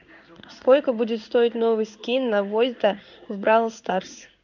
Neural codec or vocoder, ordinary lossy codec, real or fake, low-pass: codec, 16 kHz in and 24 kHz out, 1 kbps, XY-Tokenizer; none; fake; 7.2 kHz